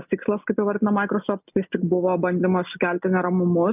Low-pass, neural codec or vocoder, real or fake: 3.6 kHz; none; real